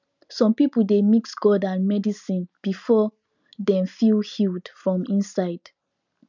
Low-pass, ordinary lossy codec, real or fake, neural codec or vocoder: 7.2 kHz; none; real; none